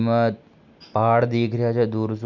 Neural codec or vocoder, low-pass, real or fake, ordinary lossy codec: none; 7.2 kHz; real; none